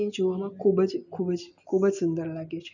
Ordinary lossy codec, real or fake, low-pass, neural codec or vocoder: none; real; 7.2 kHz; none